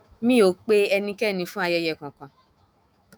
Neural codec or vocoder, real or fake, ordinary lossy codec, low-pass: autoencoder, 48 kHz, 128 numbers a frame, DAC-VAE, trained on Japanese speech; fake; none; none